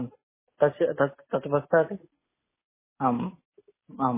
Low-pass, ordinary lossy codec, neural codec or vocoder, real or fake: 3.6 kHz; MP3, 16 kbps; none; real